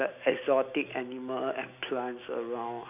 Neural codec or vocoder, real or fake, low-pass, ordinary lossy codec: none; real; 3.6 kHz; none